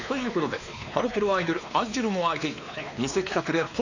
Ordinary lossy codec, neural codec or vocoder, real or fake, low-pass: none; codec, 16 kHz, 2 kbps, X-Codec, WavLM features, trained on Multilingual LibriSpeech; fake; 7.2 kHz